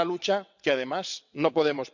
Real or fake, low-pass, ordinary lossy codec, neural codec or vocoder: fake; 7.2 kHz; none; vocoder, 22.05 kHz, 80 mel bands, WaveNeXt